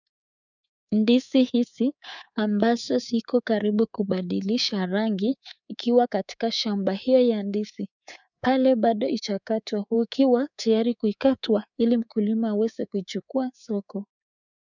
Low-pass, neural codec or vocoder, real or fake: 7.2 kHz; codec, 24 kHz, 3.1 kbps, DualCodec; fake